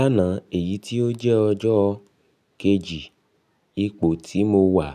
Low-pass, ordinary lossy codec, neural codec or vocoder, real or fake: 14.4 kHz; none; none; real